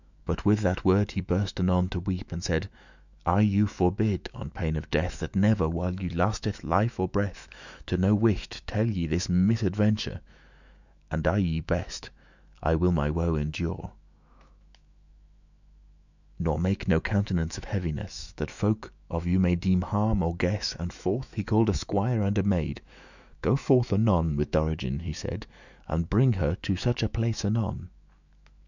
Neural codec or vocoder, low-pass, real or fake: autoencoder, 48 kHz, 128 numbers a frame, DAC-VAE, trained on Japanese speech; 7.2 kHz; fake